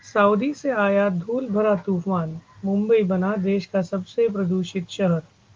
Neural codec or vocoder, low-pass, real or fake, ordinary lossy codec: none; 7.2 kHz; real; Opus, 24 kbps